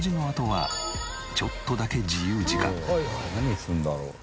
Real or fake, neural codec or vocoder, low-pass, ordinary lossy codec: real; none; none; none